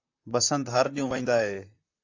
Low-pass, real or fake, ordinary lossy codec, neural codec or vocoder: 7.2 kHz; fake; AAC, 48 kbps; vocoder, 44.1 kHz, 128 mel bands, Pupu-Vocoder